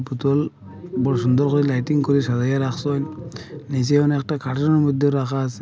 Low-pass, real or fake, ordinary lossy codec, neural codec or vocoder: none; real; none; none